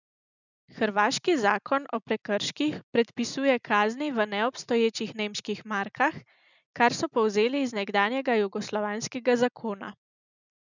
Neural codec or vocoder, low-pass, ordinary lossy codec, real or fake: none; 7.2 kHz; none; real